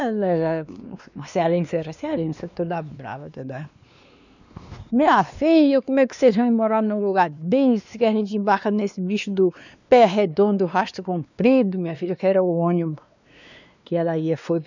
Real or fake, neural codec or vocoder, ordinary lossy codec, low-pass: fake; codec, 16 kHz, 2 kbps, X-Codec, WavLM features, trained on Multilingual LibriSpeech; none; 7.2 kHz